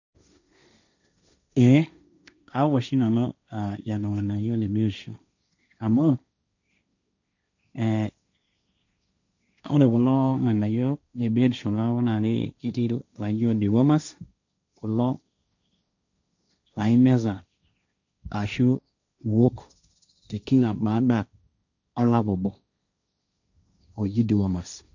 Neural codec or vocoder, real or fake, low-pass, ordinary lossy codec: codec, 16 kHz, 1.1 kbps, Voila-Tokenizer; fake; 7.2 kHz; none